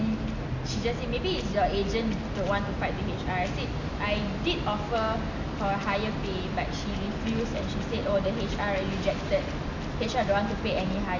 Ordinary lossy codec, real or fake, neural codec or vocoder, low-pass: none; real; none; 7.2 kHz